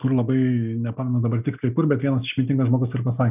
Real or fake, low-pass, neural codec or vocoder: real; 3.6 kHz; none